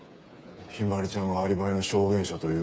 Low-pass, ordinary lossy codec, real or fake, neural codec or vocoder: none; none; fake; codec, 16 kHz, 8 kbps, FreqCodec, smaller model